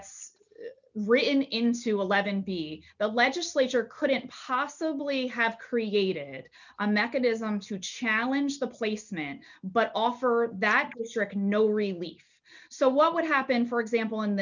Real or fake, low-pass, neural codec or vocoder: real; 7.2 kHz; none